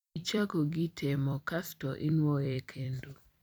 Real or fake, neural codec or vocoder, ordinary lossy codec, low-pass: fake; vocoder, 44.1 kHz, 128 mel bands every 256 samples, BigVGAN v2; none; none